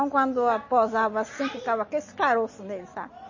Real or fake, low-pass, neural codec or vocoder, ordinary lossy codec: real; 7.2 kHz; none; MP3, 32 kbps